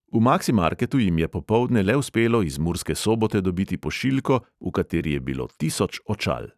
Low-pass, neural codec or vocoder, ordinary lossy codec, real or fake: 14.4 kHz; vocoder, 44.1 kHz, 128 mel bands every 256 samples, BigVGAN v2; none; fake